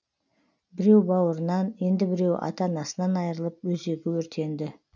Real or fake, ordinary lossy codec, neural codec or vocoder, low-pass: real; none; none; 7.2 kHz